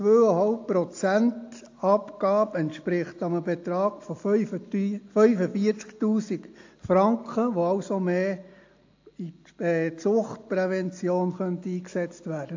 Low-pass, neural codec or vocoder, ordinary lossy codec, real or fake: 7.2 kHz; none; AAC, 48 kbps; real